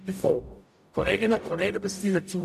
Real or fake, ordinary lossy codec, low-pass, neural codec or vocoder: fake; none; 14.4 kHz; codec, 44.1 kHz, 0.9 kbps, DAC